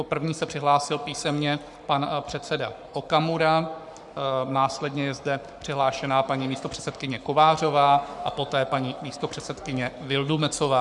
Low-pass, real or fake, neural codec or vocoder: 10.8 kHz; fake; codec, 44.1 kHz, 7.8 kbps, Pupu-Codec